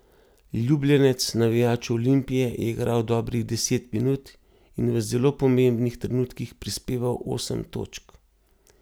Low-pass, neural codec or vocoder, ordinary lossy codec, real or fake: none; none; none; real